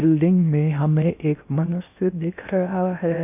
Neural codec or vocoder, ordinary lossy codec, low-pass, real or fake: codec, 16 kHz in and 24 kHz out, 0.6 kbps, FocalCodec, streaming, 4096 codes; AAC, 32 kbps; 3.6 kHz; fake